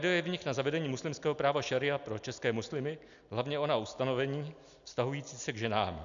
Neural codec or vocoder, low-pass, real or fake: none; 7.2 kHz; real